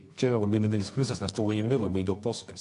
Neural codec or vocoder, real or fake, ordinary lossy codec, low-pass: codec, 24 kHz, 0.9 kbps, WavTokenizer, medium music audio release; fake; AAC, 64 kbps; 10.8 kHz